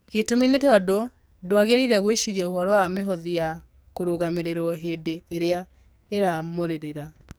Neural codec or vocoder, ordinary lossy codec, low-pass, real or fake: codec, 44.1 kHz, 2.6 kbps, SNAC; none; none; fake